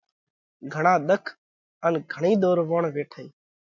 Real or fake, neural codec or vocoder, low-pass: real; none; 7.2 kHz